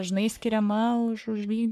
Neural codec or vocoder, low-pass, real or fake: codec, 44.1 kHz, 3.4 kbps, Pupu-Codec; 14.4 kHz; fake